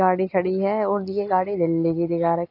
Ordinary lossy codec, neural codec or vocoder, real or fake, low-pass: AAC, 32 kbps; none; real; 5.4 kHz